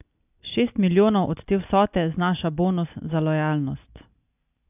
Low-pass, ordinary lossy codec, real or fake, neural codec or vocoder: 3.6 kHz; AAC, 32 kbps; real; none